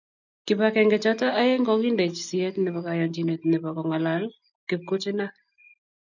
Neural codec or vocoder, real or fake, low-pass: none; real; 7.2 kHz